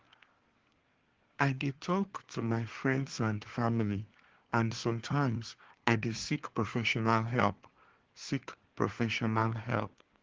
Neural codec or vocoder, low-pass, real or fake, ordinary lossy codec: codec, 24 kHz, 1 kbps, SNAC; 7.2 kHz; fake; Opus, 16 kbps